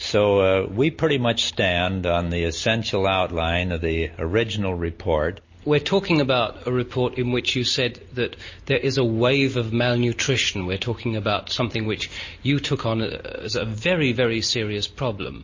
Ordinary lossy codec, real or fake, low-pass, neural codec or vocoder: MP3, 32 kbps; real; 7.2 kHz; none